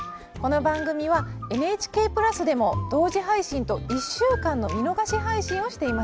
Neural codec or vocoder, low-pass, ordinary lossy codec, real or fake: none; none; none; real